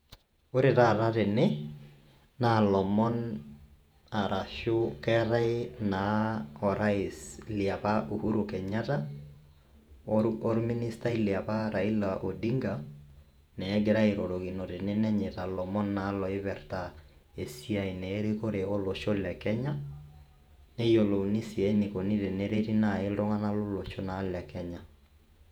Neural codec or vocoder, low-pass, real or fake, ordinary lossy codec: vocoder, 48 kHz, 128 mel bands, Vocos; 19.8 kHz; fake; none